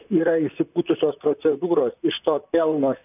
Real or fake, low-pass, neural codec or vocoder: fake; 3.6 kHz; vocoder, 44.1 kHz, 128 mel bands, Pupu-Vocoder